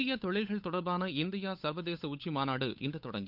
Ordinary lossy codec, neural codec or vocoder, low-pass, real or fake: none; codec, 16 kHz, 8 kbps, FunCodec, trained on Chinese and English, 25 frames a second; 5.4 kHz; fake